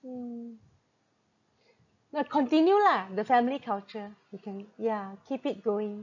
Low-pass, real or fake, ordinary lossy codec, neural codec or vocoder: 7.2 kHz; real; none; none